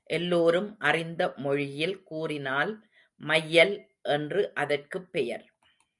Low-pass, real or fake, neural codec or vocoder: 10.8 kHz; real; none